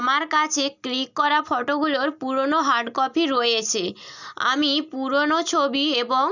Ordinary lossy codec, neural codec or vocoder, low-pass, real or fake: none; none; 7.2 kHz; real